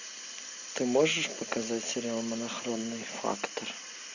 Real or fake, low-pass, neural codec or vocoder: real; 7.2 kHz; none